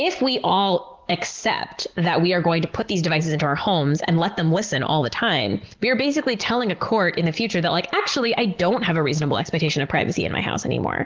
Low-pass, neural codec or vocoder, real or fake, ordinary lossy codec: 7.2 kHz; none; real; Opus, 16 kbps